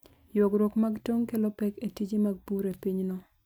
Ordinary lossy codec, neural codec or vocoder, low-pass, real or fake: none; none; none; real